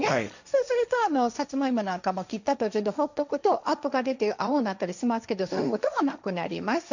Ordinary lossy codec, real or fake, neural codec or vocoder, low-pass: none; fake; codec, 16 kHz, 1.1 kbps, Voila-Tokenizer; 7.2 kHz